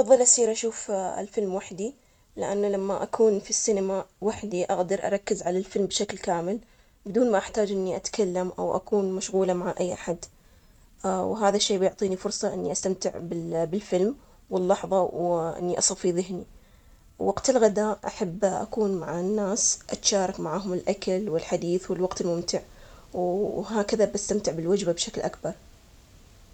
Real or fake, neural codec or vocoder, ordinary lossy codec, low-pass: real; none; none; 19.8 kHz